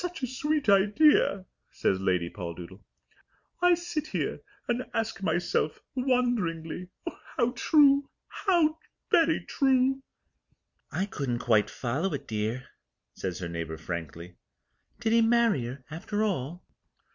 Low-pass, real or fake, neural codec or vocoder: 7.2 kHz; real; none